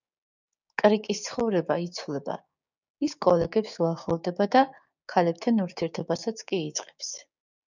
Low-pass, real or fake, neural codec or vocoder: 7.2 kHz; fake; codec, 16 kHz, 6 kbps, DAC